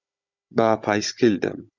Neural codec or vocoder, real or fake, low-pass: codec, 16 kHz, 16 kbps, FunCodec, trained on Chinese and English, 50 frames a second; fake; 7.2 kHz